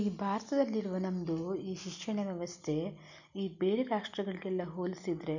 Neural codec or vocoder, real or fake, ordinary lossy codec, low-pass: none; real; none; 7.2 kHz